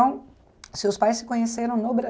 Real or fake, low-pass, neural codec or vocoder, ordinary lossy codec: real; none; none; none